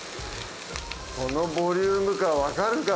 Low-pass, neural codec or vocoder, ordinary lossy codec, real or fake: none; none; none; real